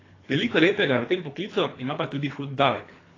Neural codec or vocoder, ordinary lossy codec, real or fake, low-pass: codec, 24 kHz, 3 kbps, HILCodec; AAC, 32 kbps; fake; 7.2 kHz